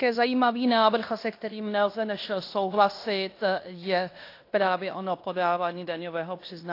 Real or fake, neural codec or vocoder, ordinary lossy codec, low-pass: fake; codec, 16 kHz in and 24 kHz out, 0.9 kbps, LongCat-Audio-Codec, fine tuned four codebook decoder; AAC, 32 kbps; 5.4 kHz